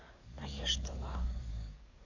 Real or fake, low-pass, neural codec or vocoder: fake; 7.2 kHz; codec, 44.1 kHz, 7.8 kbps, DAC